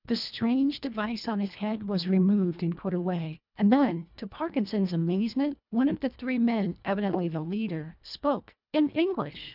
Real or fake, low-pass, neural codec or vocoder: fake; 5.4 kHz; codec, 24 kHz, 1.5 kbps, HILCodec